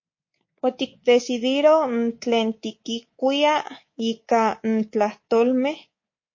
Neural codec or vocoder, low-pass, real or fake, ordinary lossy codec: codec, 24 kHz, 3.1 kbps, DualCodec; 7.2 kHz; fake; MP3, 32 kbps